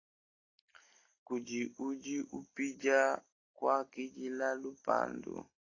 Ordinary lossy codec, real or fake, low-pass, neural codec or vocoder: AAC, 32 kbps; real; 7.2 kHz; none